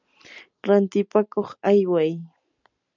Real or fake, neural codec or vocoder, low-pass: real; none; 7.2 kHz